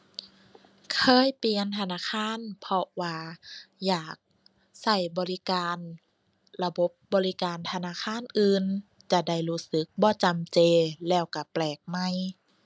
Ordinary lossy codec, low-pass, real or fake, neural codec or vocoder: none; none; real; none